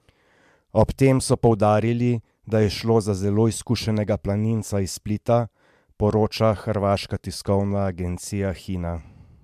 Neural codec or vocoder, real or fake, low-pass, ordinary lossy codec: none; real; 14.4 kHz; MP3, 96 kbps